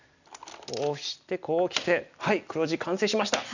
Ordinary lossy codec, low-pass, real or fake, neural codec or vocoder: none; 7.2 kHz; real; none